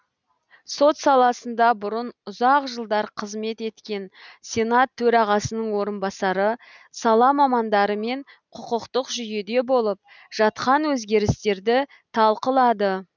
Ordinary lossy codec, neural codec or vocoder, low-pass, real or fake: none; none; 7.2 kHz; real